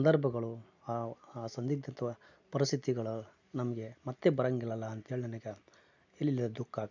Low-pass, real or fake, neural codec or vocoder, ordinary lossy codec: 7.2 kHz; real; none; none